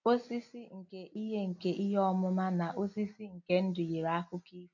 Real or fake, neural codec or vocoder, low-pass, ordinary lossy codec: real; none; 7.2 kHz; none